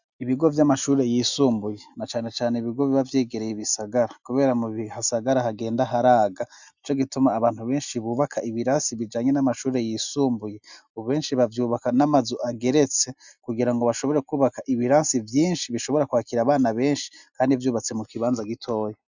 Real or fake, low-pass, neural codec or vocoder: real; 7.2 kHz; none